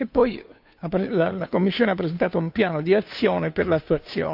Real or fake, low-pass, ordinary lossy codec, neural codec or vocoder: fake; 5.4 kHz; AAC, 48 kbps; codec, 16 kHz, 2 kbps, FunCodec, trained on Chinese and English, 25 frames a second